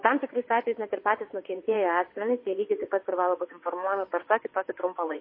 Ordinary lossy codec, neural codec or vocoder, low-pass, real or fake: MP3, 24 kbps; vocoder, 22.05 kHz, 80 mel bands, WaveNeXt; 5.4 kHz; fake